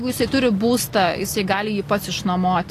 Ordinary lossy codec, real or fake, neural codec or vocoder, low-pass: AAC, 48 kbps; real; none; 14.4 kHz